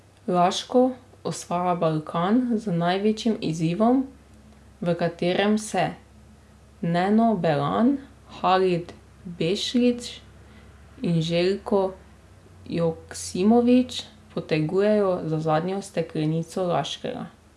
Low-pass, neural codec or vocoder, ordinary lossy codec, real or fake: none; none; none; real